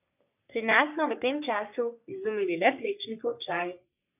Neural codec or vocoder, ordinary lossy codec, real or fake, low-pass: codec, 44.1 kHz, 3.4 kbps, Pupu-Codec; none; fake; 3.6 kHz